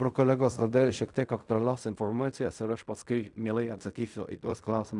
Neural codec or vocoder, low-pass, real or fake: codec, 16 kHz in and 24 kHz out, 0.4 kbps, LongCat-Audio-Codec, fine tuned four codebook decoder; 10.8 kHz; fake